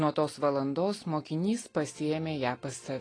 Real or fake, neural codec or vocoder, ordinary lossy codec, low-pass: real; none; AAC, 32 kbps; 9.9 kHz